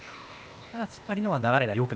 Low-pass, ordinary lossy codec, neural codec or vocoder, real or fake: none; none; codec, 16 kHz, 0.8 kbps, ZipCodec; fake